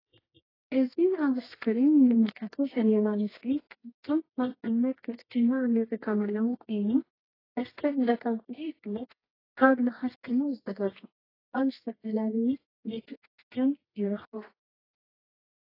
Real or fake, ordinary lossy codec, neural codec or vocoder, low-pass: fake; AAC, 24 kbps; codec, 24 kHz, 0.9 kbps, WavTokenizer, medium music audio release; 5.4 kHz